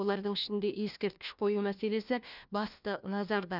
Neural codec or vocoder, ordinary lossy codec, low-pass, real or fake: codec, 16 kHz, 1 kbps, FunCodec, trained on LibriTTS, 50 frames a second; none; 5.4 kHz; fake